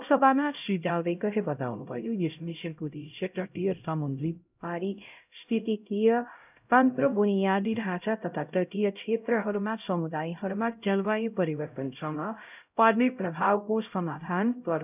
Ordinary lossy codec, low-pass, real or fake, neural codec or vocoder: none; 3.6 kHz; fake; codec, 16 kHz, 0.5 kbps, X-Codec, HuBERT features, trained on LibriSpeech